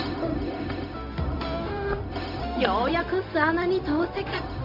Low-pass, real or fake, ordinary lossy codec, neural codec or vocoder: 5.4 kHz; fake; none; codec, 16 kHz, 0.4 kbps, LongCat-Audio-Codec